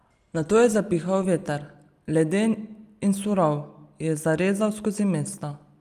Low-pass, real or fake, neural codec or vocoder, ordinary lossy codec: 14.4 kHz; real; none; Opus, 32 kbps